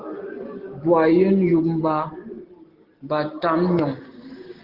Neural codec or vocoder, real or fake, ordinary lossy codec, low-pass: none; real; Opus, 16 kbps; 5.4 kHz